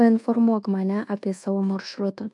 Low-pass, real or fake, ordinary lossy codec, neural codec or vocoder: 10.8 kHz; fake; AAC, 64 kbps; codec, 24 kHz, 1.2 kbps, DualCodec